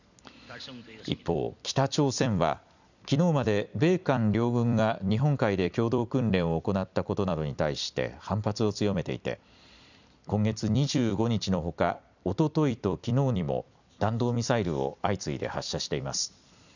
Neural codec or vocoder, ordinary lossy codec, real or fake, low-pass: vocoder, 44.1 kHz, 128 mel bands every 256 samples, BigVGAN v2; none; fake; 7.2 kHz